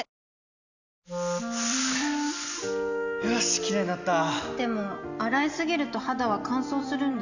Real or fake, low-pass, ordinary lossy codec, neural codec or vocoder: real; 7.2 kHz; none; none